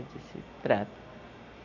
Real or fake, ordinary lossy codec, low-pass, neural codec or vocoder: real; none; 7.2 kHz; none